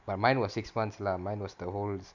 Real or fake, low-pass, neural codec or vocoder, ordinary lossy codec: real; 7.2 kHz; none; none